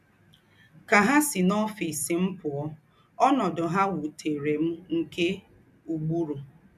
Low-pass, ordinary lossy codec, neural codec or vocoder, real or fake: 14.4 kHz; none; none; real